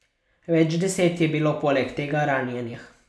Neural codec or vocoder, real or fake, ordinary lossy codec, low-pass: none; real; none; none